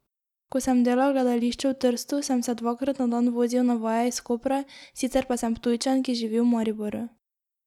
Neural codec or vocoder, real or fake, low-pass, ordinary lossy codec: none; real; 19.8 kHz; none